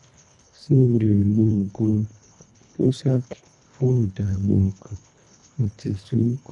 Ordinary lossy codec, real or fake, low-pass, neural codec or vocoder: none; fake; 10.8 kHz; codec, 24 kHz, 1.5 kbps, HILCodec